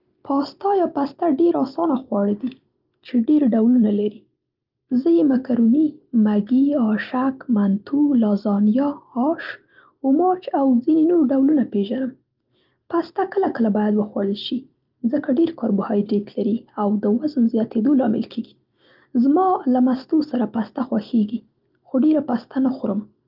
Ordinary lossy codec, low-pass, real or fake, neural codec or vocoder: Opus, 32 kbps; 5.4 kHz; real; none